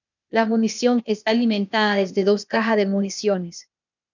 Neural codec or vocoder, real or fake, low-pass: codec, 16 kHz, 0.8 kbps, ZipCodec; fake; 7.2 kHz